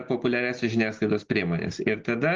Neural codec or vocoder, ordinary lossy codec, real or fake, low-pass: none; Opus, 16 kbps; real; 7.2 kHz